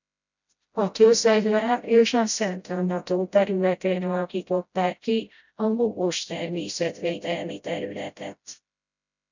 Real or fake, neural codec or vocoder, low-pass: fake; codec, 16 kHz, 0.5 kbps, FreqCodec, smaller model; 7.2 kHz